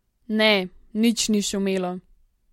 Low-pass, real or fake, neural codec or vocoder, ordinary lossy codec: 19.8 kHz; real; none; MP3, 64 kbps